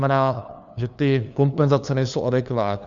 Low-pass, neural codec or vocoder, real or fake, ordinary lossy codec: 7.2 kHz; codec, 16 kHz, 2 kbps, FunCodec, trained on LibriTTS, 25 frames a second; fake; Opus, 64 kbps